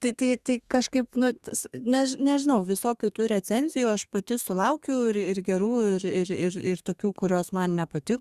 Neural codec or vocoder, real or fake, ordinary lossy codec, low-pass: codec, 32 kHz, 1.9 kbps, SNAC; fake; Opus, 64 kbps; 14.4 kHz